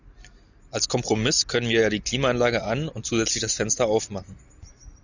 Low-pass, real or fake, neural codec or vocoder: 7.2 kHz; real; none